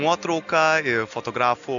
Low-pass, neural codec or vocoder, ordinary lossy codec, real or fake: 7.2 kHz; none; MP3, 96 kbps; real